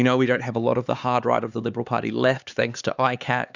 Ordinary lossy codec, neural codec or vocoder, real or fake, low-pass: Opus, 64 kbps; codec, 16 kHz, 4 kbps, X-Codec, HuBERT features, trained on LibriSpeech; fake; 7.2 kHz